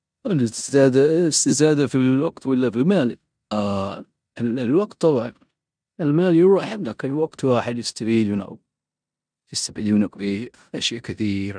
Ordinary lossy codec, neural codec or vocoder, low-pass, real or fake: none; codec, 16 kHz in and 24 kHz out, 0.9 kbps, LongCat-Audio-Codec, four codebook decoder; 9.9 kHz; fake